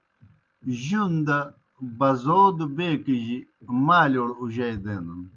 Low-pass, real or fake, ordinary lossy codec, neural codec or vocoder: 7.2 kHz; real; Opus, 32 kbps; none